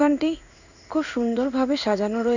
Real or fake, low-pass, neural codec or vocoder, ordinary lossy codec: fake; 7.2 kHz; codec, 16 kHz in and 24 kHz out, 1 kbps, XY-Tokenizer; none